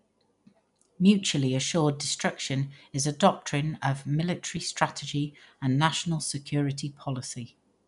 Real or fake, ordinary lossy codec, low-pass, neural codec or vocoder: real; none; 10.8 kHz; none